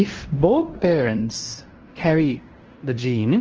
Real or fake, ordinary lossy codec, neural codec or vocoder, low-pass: fake; Opus, 16 kbps; codec, 16 kHz in and 24 kHz out, 0.9 kbps, LongCat-Audio-Codec, fine tuned four codebook decoder; 7.2 kHz